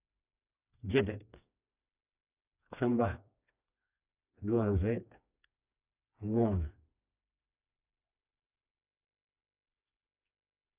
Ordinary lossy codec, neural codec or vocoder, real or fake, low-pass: none; codec, 16 kHz, 2 kbps, FreqCodec, smaller model; fake; 3.6 kHz